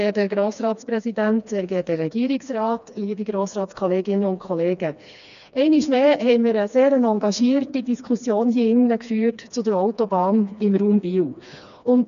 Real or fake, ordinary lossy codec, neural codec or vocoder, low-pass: fake; none; codec, 16 kHz, 2 kbps, FreqCodec, smaller model; 7.2 kHz